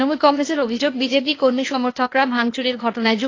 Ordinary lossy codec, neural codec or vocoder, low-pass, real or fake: AAC, 32 kbps; codec, 16 kHz, 0.8 kbps, ZipCodec; 7.2 kHz; fake